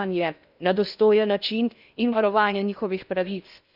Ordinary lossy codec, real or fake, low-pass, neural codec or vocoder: none; fake; 5.4 kHz; codec, 16 kHz in and 24 kHz out, 0.6 kbps, FocalCodec, streaming, 2048 codes